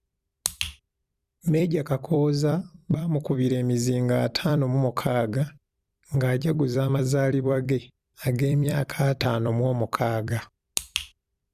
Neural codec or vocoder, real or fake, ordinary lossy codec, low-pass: vocoder, 44.1 kHz, 128 mel bands every 256 samples, BigVGAN v2; fake; Opus, 64 kbps; 14.4 kHz